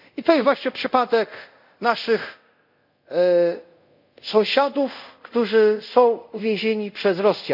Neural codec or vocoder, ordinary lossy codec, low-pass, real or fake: codec, 24 kHz, 0.5 kbps, DualCodec; none; 5.4 kHz; fake